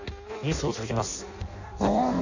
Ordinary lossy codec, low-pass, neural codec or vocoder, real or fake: none; 7.2 kHz; codec, 16 kHz in and 24 kHz out, 0.6 kbps, FireRedTTS-2 codec; fake